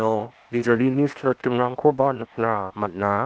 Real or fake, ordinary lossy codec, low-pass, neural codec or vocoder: fake; none; none; codec, 16 kHz, 0.8 kbps, ZipCodec